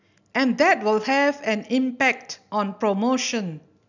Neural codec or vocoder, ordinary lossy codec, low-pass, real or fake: none; none; 7.2 kHz; real